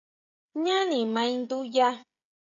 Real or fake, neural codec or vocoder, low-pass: fake; codec, 16 kHz, 16 kbps, FreqCodec, smaller model; 7.2 kHz